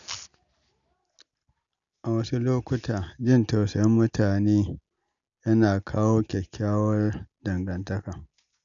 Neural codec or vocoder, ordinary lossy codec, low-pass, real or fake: none; none; 7.2 kHz; real